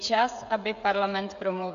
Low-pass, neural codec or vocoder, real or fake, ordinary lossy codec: 7.2 kHz; codec, 16 kHz, 16 kbps, FreqCodec, smaller model; fake; AAC, 64 kbps